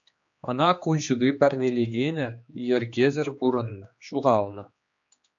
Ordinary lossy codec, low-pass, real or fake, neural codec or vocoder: AAC, 64 kbps; 7.2 kHz; fake; codec, 16 kHz, 2 kbps, X-Codec, HuBERT features, trained on general audio